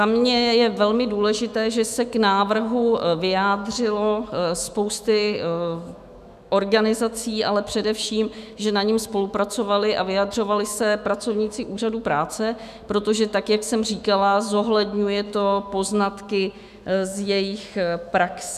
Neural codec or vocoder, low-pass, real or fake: autoencoder, 48 kHz, 128 numbers a frame, DAC-VAE, trained on Japanese speech; 14.4 kHz; fake